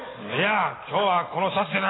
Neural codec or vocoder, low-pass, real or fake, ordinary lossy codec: none; 7.2 kHz; real; AAC, 16 kbps